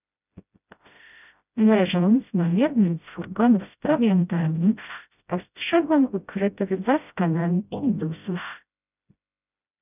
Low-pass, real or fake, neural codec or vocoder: 3.6 kHz; fake; codec, 16 kHz, 0.5 kbps, FreqCodec, smaller model